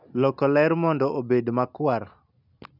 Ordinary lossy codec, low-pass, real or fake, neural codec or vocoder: none; 5.4 kHz; real; none